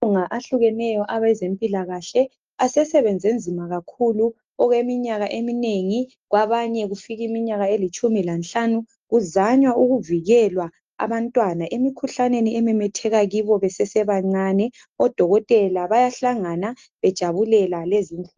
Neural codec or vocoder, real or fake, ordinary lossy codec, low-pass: none; real; Opus, 24 kbps; 7.2 kHz